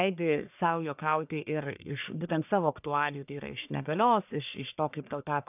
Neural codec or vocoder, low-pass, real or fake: codec, 44.1 kHz, 1.7 kbps, Pupu-Codec; 3.6 kHz; fake